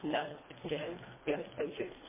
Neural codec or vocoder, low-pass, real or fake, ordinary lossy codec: codec, 24 kHz, 1.5 kbps, HILCodec; 3.6 kHz; fake; MP3, 16 kbps